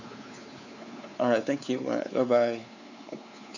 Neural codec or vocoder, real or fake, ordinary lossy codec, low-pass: codec, 16 kHz, 4 kbps, X-Codec, WavLM features, trained on Multilingual LibriSpeech; fake; none; 7.2 kHz